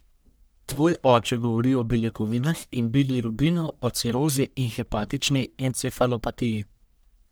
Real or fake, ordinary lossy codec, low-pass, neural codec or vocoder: fake; none; none; codec, 44.1 kHz, 1.7 kbps, Pupu-Codec